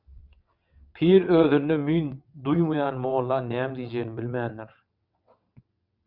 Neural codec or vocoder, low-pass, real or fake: vocoder, 22.05 kHz, 80 mel bands, WaveNeXt; 5.4 kHz; fake